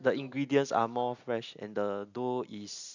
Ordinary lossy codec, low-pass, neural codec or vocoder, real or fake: none; 7.2 kHz; none; real